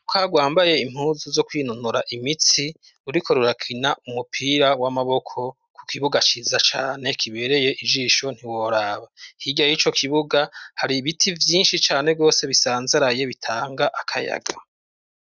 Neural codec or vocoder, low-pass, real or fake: none; 7.2 kHz; real